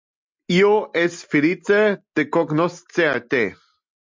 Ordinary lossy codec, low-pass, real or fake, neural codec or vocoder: MP3, 64 kbps; 7.2 kHz; real; none